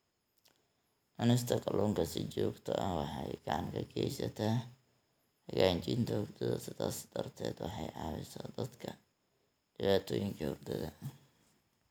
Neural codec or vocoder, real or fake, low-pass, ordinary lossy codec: none; real; none; none